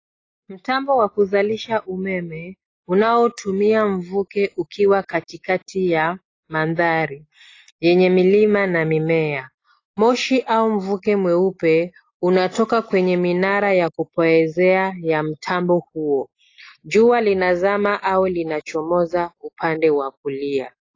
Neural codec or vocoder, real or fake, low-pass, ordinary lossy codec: none; real; 7.2 kHz; AAC, 32 kbps